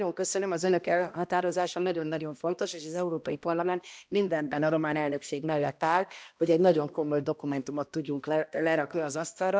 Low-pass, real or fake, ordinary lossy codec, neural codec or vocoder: none; fake; none; codec, 16 kHz, 1 kbps, X-Codec, HuBERT features, trained on balanced general audio